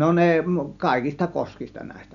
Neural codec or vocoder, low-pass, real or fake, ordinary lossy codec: none; 7.2 kHz; real; none